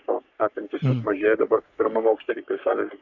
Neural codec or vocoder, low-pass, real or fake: codec, 44.1 kHz, 3.4 kbps, Pupu-Codec; 7.2 kHz; fake